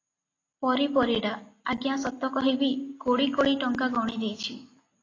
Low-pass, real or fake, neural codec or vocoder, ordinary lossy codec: 7.2 kHz; real; none; AAC, 32 kbps